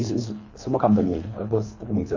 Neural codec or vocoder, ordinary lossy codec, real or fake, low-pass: codec, 24 kHz, 3 kbps, HILCodec; AAC, 48 kbps; fake; 7.2 kHz